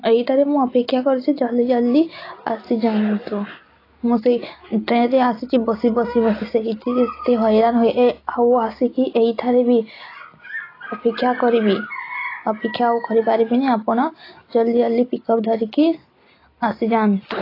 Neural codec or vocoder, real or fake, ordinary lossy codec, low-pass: none; real; AAC, 24 kbps; 5.4 kHz